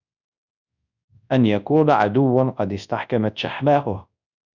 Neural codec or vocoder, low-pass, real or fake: codec, 24 kHz, 0.9 kbps, WavTokenizer, large speech release; 7.2 kHz; fake